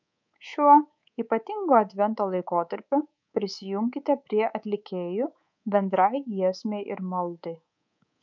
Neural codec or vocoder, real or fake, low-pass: codec, 24 kHz, 3.1 kbps, DualCodec; fake; 7.2 kHz